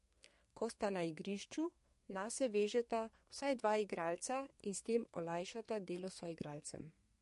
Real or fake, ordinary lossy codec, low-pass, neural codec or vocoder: fake; MP3, 48 kbps; 14.4 kHz; codec, 32 kHz, 1.9 kbps, SNAC